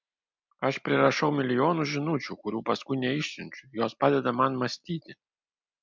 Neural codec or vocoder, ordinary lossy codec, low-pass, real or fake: none; MP3, 64 kbps; 7.2 kHz; real